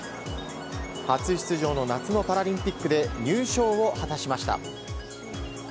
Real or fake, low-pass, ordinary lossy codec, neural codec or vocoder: real; none; none; none